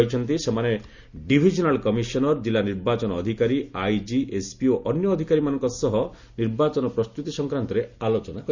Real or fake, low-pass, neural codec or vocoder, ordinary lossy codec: real; 7.2 kHz; none; Opus, 64 kbps